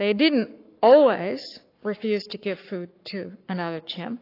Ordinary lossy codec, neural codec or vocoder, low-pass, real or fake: AAC, 32 kbps; codec, 44.1 kHz, 7.8 kbps, Pupu-Codec; 5.4 kHz; fake